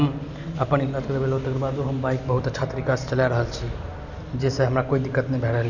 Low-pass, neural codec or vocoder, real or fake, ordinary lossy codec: 7.2 kHz; none; real; none